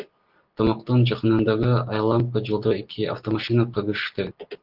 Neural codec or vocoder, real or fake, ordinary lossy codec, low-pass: none; real; Opus, 16 kbps; 5.4 kHz